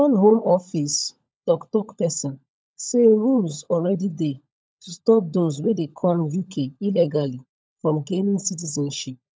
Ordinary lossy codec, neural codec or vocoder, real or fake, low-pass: none; codec, 16 kHz, 16 kbps, FunCodec, trained on LibriTTS, 50 frames a second; fake; none